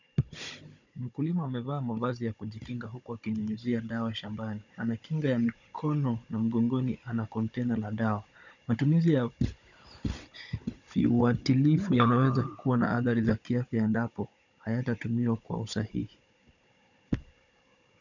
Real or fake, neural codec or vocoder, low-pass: fake; codec, 16 kHz, 16 kbps, FunCodec, trained on Chinese and English, 50 frames a second; 7.2 kHz